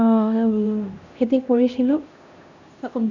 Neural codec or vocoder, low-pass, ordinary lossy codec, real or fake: codec, 16 kHz, 1 kbps, X-Codec, HuBERT features, trained on LibriSpeech; 7.2 kHz; none; fake